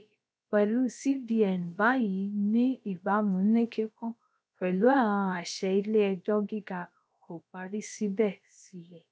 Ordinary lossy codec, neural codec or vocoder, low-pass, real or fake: none; codec, 16 kHz, about 1 kbps, DyCAST, with the encoder's durations; none; fake